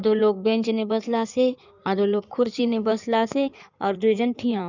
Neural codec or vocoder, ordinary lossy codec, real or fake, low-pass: codec, 16 kHz in and 24 kHz out, 2.2 kbps, FireRedTTS-2 codec; none; fake; 7.2 kHz